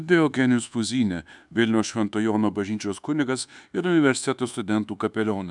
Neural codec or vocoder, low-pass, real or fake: codec, 24 kHz, 1.2 kbps, DualCodec; 10.8 kHz; fake